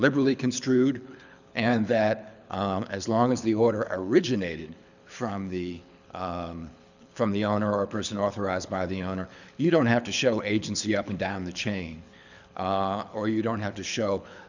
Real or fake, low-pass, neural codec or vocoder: fake; 7.2 kHz; codec, 24 kHz, 6 kbps, HILCodec